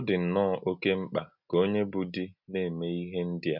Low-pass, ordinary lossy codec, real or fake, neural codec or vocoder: 5.4 kHz; none; real; none